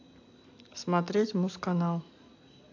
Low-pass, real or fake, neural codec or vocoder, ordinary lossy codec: 7.2 kHz; real; none; AAC, 48 kbps